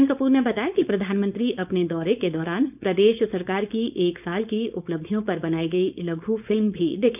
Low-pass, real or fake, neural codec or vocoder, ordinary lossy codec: 3.6 kHz; fake; codec, 16 kHz, 4.8 kbps, FACodec; none